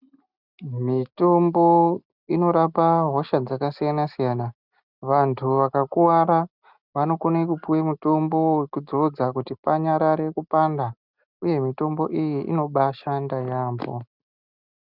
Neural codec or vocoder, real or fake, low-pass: none; real; 5.4 kHz